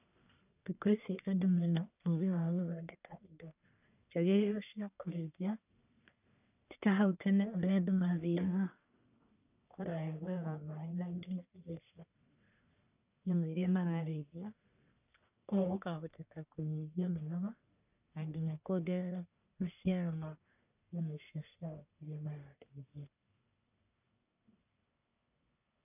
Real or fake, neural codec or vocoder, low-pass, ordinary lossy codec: fake; codec, 44.1 kHz, 1.7 kbps, Pupu-Codec; 3.6 kHz; none